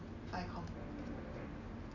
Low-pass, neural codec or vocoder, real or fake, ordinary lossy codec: 7.2 kHz; none; real; none